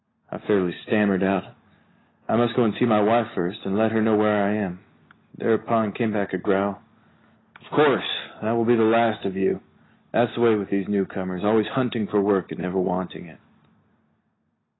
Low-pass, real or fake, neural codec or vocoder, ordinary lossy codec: 7.2 kHz; real; none; AAC, 16 kbps